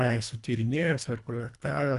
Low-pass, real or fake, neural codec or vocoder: 10.8 kHz; fake; codec, 24 kHz, 1.5 kbps, HILCodec